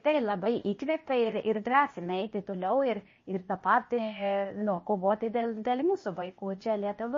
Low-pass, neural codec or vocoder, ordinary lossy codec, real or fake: 7.2 kHz; codec, 16 kHz, 0.8 kbps, ZipCodec; MP3, 32 kbps; fake